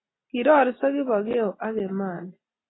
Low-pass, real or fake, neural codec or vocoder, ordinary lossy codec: 7.2 kHz; real; none; AAC, 16 kbps